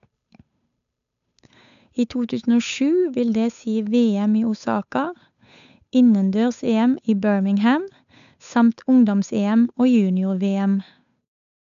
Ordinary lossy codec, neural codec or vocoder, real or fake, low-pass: none; codec, 16 kHz, 8 kbps, FunCodec, trained on Chinese and English, 25 frames a second; fake; 7.2 kHz